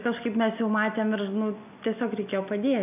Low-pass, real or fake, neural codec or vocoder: 3.6 kHz; real; none